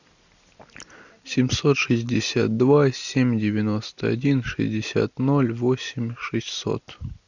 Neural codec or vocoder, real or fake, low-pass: none; real; 7.2 kHz